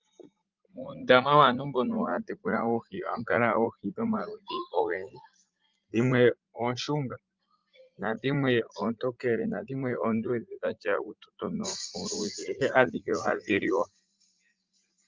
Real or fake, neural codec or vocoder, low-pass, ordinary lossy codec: fake; vocoder, 44.1 kHz, 80 mel bands, Vocos; 7.2 kHz; Opus, 24 kbps